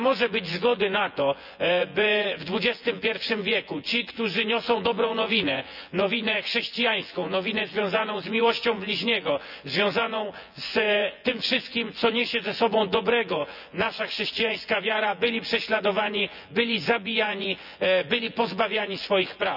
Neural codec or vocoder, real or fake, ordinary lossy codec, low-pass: vocoder, 24 kHz, 100 mel bands, Vocos; fake; none; 5.4 kHz